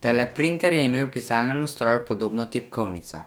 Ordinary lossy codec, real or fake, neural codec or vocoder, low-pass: none; fake; codec, 44.1 kHz, 2.6 kbps, DAC; none